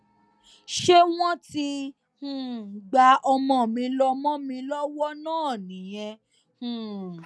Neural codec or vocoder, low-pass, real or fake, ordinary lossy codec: none; none; real; none